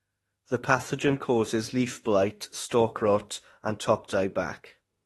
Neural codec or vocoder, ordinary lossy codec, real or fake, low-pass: autoencoder, 48 kHz, 32 numbers a frame, DAC-VAE, trained on Japanese speech; AAC, 32 kbps; fake; 19.8 kHz